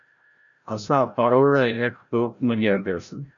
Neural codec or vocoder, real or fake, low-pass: codec, 16 kHz, 0.5 kbps, FreqCodec, larger model; fake; 7.2 kHz